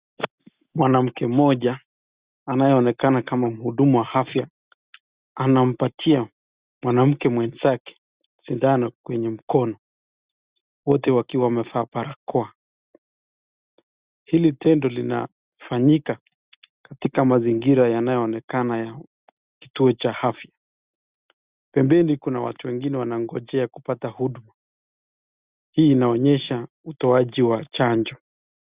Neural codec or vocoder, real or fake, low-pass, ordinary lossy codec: none; real; 3.6 kHz; Opus, 64 kbps